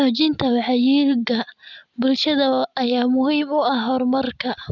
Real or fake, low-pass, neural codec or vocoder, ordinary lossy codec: real; 7.2 kHz; none; none